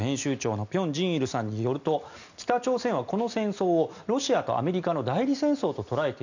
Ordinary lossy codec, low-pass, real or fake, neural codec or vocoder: none; 7.2 kHz; real; none